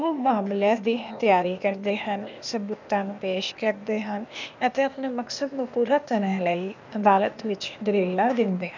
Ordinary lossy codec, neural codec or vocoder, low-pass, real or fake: none; codec, 16 kHz, 0.8 kbps, ZipCodec; 7.2 kHz; fake